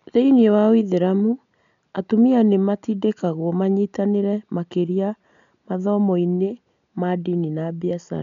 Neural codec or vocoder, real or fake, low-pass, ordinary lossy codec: none; real; 7.2 kHz; none